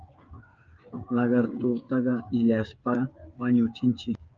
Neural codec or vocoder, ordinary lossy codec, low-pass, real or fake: codec, 16 kHz, 8 kbps, FreqCodec, smaller model; Opus, 24 kbps; 7.2 kHz; fake